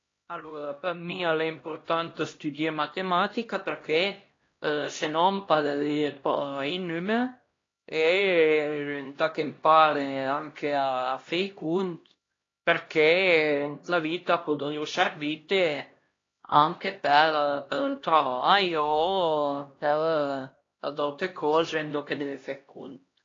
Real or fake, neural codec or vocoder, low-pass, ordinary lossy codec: fake; codec, 16 kHz, 1 kbps, X-Codec, HuBERT features, trained on LibriSpeech; 7.2 kHz; AAC, 32 kbps